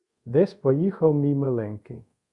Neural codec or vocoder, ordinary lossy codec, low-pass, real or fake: codec, 24 kHz, 0.5 kbps, DualCodec; Opus, 64 kbps; 10.8 kHz; fake